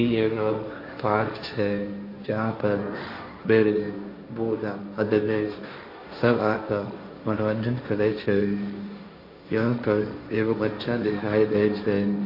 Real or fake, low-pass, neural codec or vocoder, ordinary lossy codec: fake; 5.4 kHz; codec, 16 kHz, 1.1 kbps, Voila-Tokenizer; MP3, 32 kbps